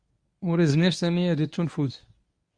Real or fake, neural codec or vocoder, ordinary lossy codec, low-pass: fake; codec, 24 kHz, 0.9 kbps, WavTokenizer, medium speech release version 1; Opus, 64 kbps; 9.9 kHz